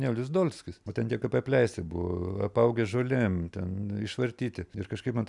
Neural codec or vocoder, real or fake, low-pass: none; real; 10.8 kHz